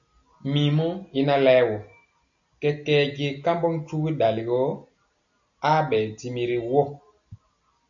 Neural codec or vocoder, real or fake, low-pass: none; real; 7.2 kHz